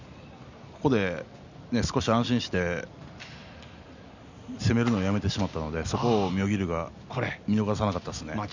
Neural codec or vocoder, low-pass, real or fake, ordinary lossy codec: none; 7.2 kHz; real; none